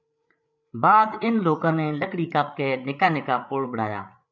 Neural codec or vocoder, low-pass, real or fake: codec, 16 kHz, 8 kbps, FreqCodec, larger model; 7.2 kHz; fake